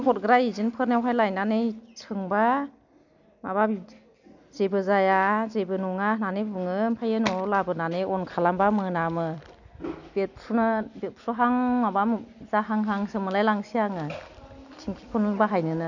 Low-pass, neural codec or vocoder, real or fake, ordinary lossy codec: 7.2 kHz; none; real; none